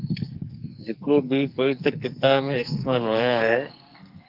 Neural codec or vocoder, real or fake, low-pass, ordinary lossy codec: codec, 44.1 kHz, 2.6 kbps, SNAC; fake; 5.4 kHz; Opus, 24 kbps